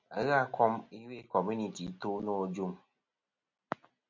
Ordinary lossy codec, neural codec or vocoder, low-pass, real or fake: MP3, 64 kbps; none; 7.2 kHz; real